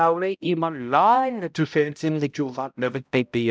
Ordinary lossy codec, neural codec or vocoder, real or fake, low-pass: none; codec, 16 kHz, 0.5 kbps, X-Codec, HuBERT features, trained on balanced general audio; fake; none